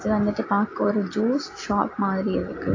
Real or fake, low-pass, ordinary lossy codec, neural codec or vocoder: real; 7.2 kHz; AAC, 32 kbps; none